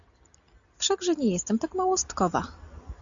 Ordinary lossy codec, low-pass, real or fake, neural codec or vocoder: AAC, 64 kbps; 7.2 kHz; real; none